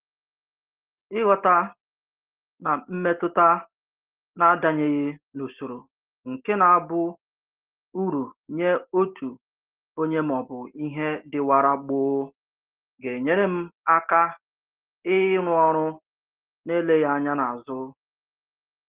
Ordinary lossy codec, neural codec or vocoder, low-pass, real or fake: Opus, 16 kbps; none; 3.6 kHz; real